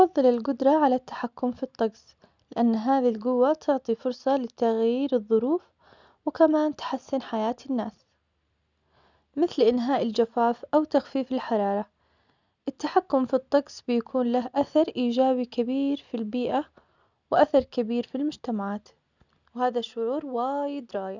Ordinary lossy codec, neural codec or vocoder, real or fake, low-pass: none; none; real; 7.2 kHz